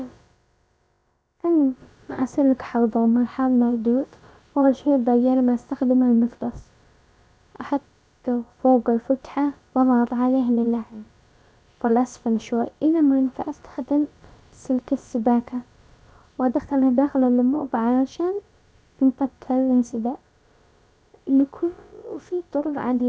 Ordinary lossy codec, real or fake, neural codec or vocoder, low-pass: none; fake; codec, 16 kHz, about 1 kbps, DyCAST, with the encoder's durations; none